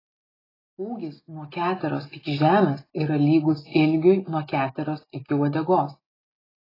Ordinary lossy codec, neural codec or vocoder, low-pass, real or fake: AAC, 24 kbps; none; 5.4 kHz; real